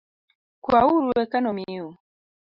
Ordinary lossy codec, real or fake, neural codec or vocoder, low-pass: Opus, 64 kbps; real; none; 5.4 kHz